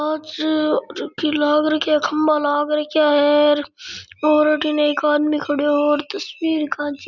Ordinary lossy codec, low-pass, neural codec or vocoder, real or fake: none; 7.2 kHz; none; real